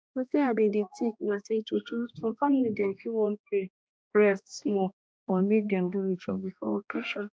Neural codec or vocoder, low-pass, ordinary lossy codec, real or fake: codec, 16 kHz, 1 kbps, X-Codec, HuBERT features, trained on balanced general audio; none; none; fake